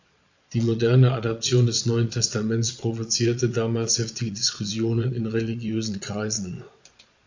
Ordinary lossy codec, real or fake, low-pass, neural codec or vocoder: AAC, 48 kbps; fake; 7.2 kHz; vocoder, 22.05 kHz, 80 mel bands, Vocos